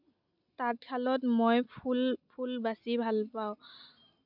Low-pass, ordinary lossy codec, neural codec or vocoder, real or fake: 5.4 kHz; none; none; real